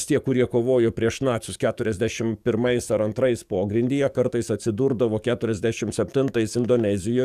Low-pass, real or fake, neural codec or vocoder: 14.4 kHz; fake; codec, 44.1 kHz, 7.8 kbps, DAC